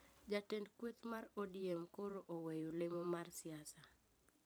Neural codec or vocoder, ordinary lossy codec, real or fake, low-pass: vocoder, 44.1 kHz, 128 mel bands every 512 samples, BigVGAN v2; none; fake; none